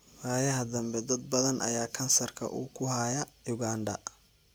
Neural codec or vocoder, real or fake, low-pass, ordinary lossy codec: none; real; none; none